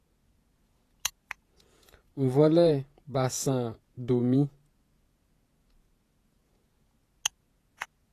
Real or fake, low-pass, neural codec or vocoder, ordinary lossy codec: fake; 14.4 kHz; vocoder, 48 kHz, 128 mel bands, Vocos; AAC, 48 kbps